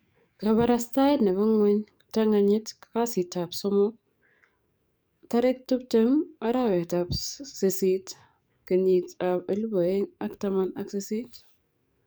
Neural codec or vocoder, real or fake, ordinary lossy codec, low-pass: codec, 44.1 kHz, 7.8 kbps, DAC; fake; none; none